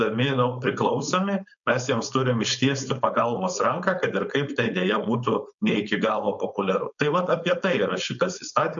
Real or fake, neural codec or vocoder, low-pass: fake; codec, 16 kHz, 4.8 kbps, FACodec; 7.2 kHz